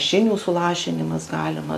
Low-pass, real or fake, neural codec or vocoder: 14.4 kHz; real; none